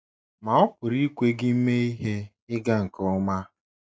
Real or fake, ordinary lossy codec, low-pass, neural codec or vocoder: real; none; none; none